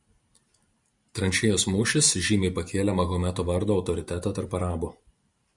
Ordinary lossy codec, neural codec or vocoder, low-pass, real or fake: Opus, 64 kbps; vocoder, 44.1 kHz, 128 mel bands every 512 samples, BigVGAN v2; 10.8 kHz; fake